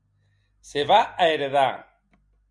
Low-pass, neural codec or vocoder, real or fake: 9.9 kHz; none; real